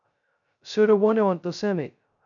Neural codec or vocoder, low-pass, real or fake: codec, 16 kHz, 0.2 kbps, FocalCodec; 7.2 kHz; fake